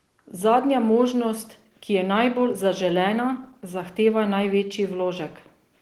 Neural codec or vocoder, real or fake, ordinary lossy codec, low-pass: none; real; Opus, 16 kbps; 19.8 kHz